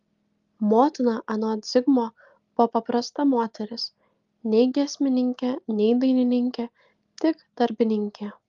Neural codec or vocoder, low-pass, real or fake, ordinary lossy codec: none; 7.2 kHz; real; Opus, 32 kbps